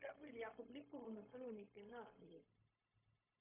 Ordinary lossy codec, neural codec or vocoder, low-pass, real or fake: AAC, 16 kbps; codec, 16 kHz, 0.4 kbps, LongCat-Audio-Codec; 3.6 kHz; fake